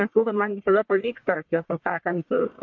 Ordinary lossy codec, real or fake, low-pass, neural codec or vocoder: MP3, 48 kbps; fake; 7.2 kHz; codec, 44.1 kHz, 1.7 kbps, Pupu-Codec